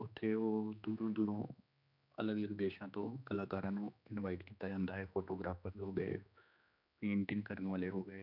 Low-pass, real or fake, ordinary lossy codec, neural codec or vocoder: 5.4 kHz; fake; none; codec, 16 kHz, 2 kbps, X-Codec, HuBERT features, trained on general audio